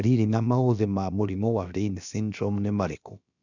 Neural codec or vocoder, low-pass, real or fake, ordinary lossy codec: codec, 16 kHz, about 1 kbps, DyCAST, with the encoder's durations; 7.2 kHz; fake; none